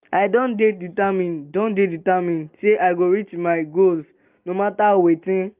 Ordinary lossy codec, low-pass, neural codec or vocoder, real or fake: Opus, 24 kbps; 3.6 kHz; none; real